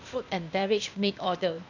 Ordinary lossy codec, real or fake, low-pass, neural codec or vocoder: none; fake; 7.2 kHz; codec, 16 kHz, 0.8 kbps, ZipCodec